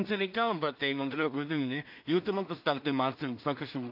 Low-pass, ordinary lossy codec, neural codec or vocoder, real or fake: 5.4 kHz; AAC, 48 kbps; codec, 16 kHz in and 24 kHz out, 0.4 kbps, LongCat-Audio-Codec, two codebook decoder; fake